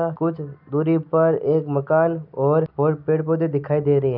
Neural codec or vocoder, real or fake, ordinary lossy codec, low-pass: none; real; none; 5.4 kHz